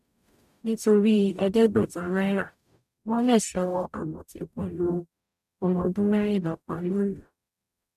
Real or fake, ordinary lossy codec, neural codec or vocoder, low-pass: fake; none; codec, 44.1 kHz, 0.9 kbps, DAC; 14.4 kHz